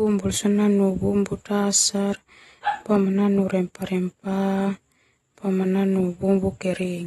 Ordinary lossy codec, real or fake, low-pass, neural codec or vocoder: AAC, 32 kbps; real; 19.8 kHz; none